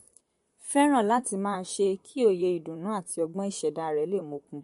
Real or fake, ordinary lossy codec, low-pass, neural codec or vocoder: fake; MP3, 48 kbps; 14.4 kHz; vocoder, 44.1 kHz, 128 mel bands, Pupu-Vocoder